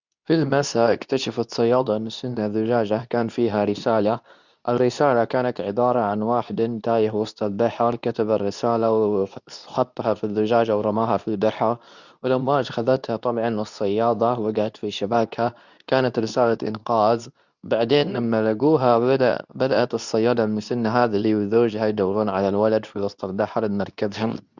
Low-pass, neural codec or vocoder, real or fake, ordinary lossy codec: 7.2 kHz; codec, 24 kHz, 0.9 kbps, WavTokenizer, medium speech release version 2; fake; none